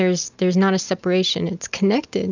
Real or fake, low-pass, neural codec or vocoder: real; 7.2 kHz; none